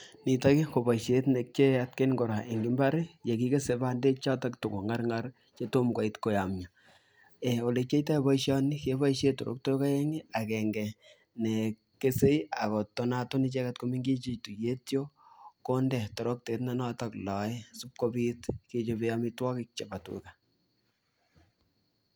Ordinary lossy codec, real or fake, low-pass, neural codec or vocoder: none; real; none; none